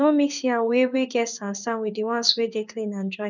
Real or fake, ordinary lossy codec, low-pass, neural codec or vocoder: fake; none; 7.2 kHz; codec, 16 kHz in and 24 kHz out, 1 kbps, XY-Tokenizer